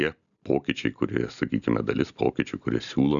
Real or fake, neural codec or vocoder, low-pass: real; none; 7.2 kHz